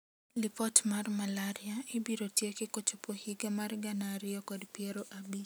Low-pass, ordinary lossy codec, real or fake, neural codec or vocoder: none; none; real; none